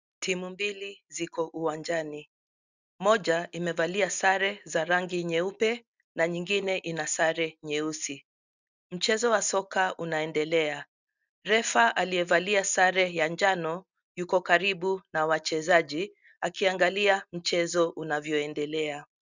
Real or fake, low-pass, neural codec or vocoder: real; 7.2 kHz; none